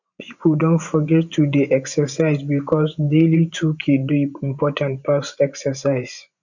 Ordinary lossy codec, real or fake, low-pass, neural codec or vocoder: none; fake; 7.2 kHz; vocoder, 24 kHz, 100 mel bands, Vocos